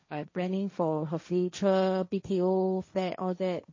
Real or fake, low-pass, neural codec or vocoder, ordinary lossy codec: fake; 7.2 kHz; codec, 16 kHz, 1.1 kbps, Voila-Tokenizer; MP3, 32 kbps